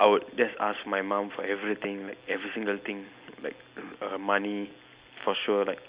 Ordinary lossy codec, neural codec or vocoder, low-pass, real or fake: Opus, 64 kbps; none; 3.6 kHz; real